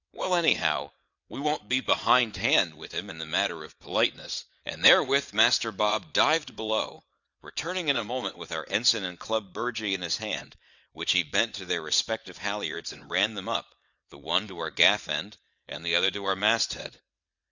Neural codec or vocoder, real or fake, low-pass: vocoder, 22.05 kHz, 80 mel bands, WaveNeXt; fake; 7.2 kHz